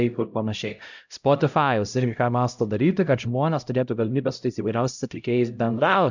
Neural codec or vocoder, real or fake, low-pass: codec, 16 kHz, 0.5 kbps, X-Codec, HuBERT features, trained on LibriSpeech; fake; 7.2 kHz